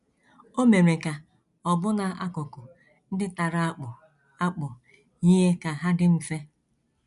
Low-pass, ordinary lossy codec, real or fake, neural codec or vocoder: 10.8 kHz; none; real; none